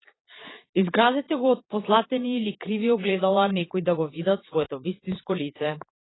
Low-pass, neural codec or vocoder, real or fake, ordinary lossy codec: 7.2 kHz; vocoder, 22.05 kHz, 80 mel bands, Vocos; fake; AAC, 16 kbps